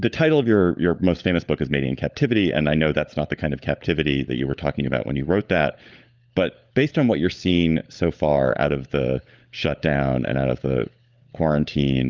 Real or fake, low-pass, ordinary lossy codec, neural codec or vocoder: fake; 7.2 kHz; Opus, 24 kbps; codec, 16 kHz, 16 kbps, FunCodec, trained on LibriTTS, 50 frames a second